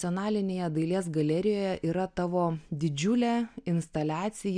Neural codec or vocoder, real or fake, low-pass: none; real; 9.9 kHz